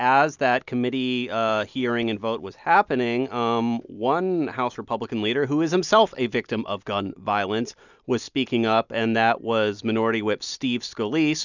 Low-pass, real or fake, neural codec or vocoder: 7.2 kHz; real; none